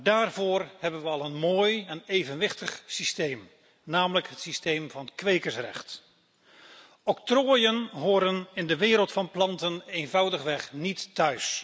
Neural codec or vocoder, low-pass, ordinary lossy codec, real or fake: none; none; none; real